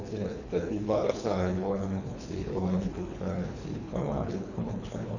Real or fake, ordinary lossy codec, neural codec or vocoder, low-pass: fake; none; codec, 24 kHz, 3 kbps, HILCodec; 7.2 kHz